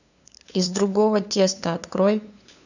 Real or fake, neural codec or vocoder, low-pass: fake; codec, 16 kHz, 2 kbps, FunCodec, trained on LibriTTS, 25 frames a second; 7.2 kHz